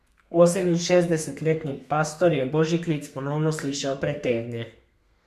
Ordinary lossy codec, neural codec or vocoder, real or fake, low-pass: none; codec, 32 kHz, 1.9 kbps, SNAC; fake; 14.4 kHz